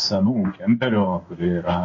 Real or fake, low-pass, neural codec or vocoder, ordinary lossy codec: fake; 7.2 kHz; codec, 16 kHz in and 24 kHz out, 1 kbps, XY-Tokenizer; MP3, 32 kbps